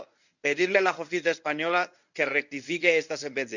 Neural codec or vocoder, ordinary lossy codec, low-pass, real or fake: codec, 24 kHz, 0.9 kbps, WavTokenizer, medium speech release version 1; none; 7.2 kHz; fake